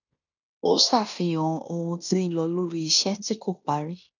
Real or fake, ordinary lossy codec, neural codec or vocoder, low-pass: fake; none; codec, 16 kHz in and 24 kHz out, 0.9 kbps, LongCat-Audio-Codec, fine tuned four codebook decoder; 7.2 kHz